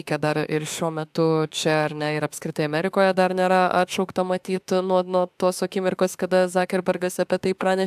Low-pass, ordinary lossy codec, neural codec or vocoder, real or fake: 14.4 kHz; AAC, 96 kbps; autoencoder, 48 kHz, 32 numbers a frame, DAC-VAE, trained on Japanese speech; fake